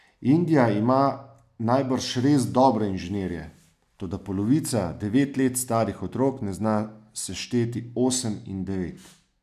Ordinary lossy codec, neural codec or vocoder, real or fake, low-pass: none; none; real; 14.4 kHz